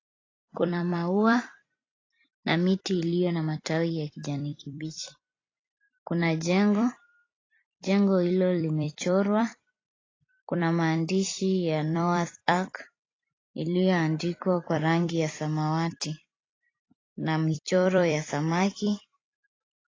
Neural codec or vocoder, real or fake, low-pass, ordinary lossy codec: vocoder, 44.1 kHz, 128 mel bands every 256 samples, BigVGAN v2; fake; 7.2 kHz; AAC, 32 kbps